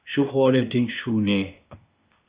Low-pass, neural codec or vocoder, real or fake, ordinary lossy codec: 3.6 kHz; codec, 16 kHz, 0.8 kbps, ZipCodec; fake; Opus, 64 kbps